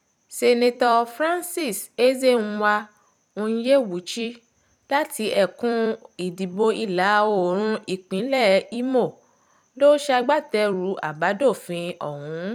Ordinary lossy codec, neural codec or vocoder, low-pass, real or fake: none; vocoder, 44.1 kHz, 128 mel bands every 256 samples, BigVGAN v2; 19.8 kHz; fake